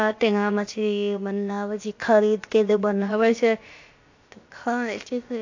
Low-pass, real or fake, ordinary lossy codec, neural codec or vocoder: 7.2 kHz; fake; AAC, 48 kbps; codec, 16 kHz, about 1 kbps, DyCAST, with the encoder's durations